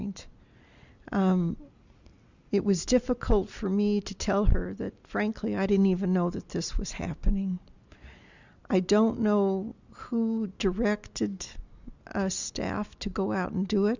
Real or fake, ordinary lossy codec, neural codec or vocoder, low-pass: real; Opus, 64 kbps; none; 7.2 kHz